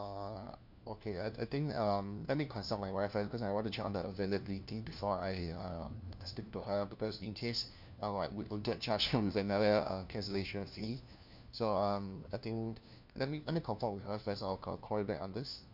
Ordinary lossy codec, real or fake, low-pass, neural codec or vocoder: none; fake; 5.4 kHz; codec, 16 kHz, 1 kbps, FunCodec, trained on LibriTTS, 50 frames a second